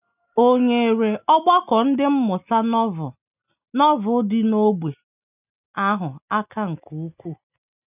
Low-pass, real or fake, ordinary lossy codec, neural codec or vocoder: 3.6 kHz; real; none; none